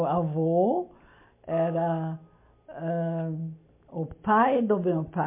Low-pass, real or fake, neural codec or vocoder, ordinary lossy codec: 3.6 kHz; real; none; MP3, 24 kbps